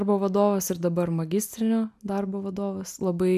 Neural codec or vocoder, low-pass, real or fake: none; 14.4 kHz; real